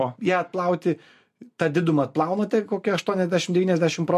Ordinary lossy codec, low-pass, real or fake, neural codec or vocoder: MP3, 64 kbps; 14.4 kHz; real; none